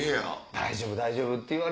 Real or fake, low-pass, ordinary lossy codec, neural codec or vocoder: real; none; none; none